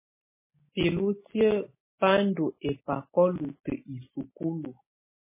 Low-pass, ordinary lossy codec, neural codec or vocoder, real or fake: 3.6 kHz; MP3, 16 kbps; none; real